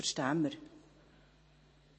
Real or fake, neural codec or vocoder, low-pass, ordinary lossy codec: fake; vocoder, 44.1 kHz, 128 mel bands every 512 samples, BigVGAN v2; 9.9 kHz; MP3, 32 kbps